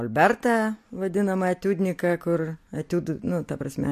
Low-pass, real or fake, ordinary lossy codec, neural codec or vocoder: 14.4 kHz; real; MP3, 64 kbps; none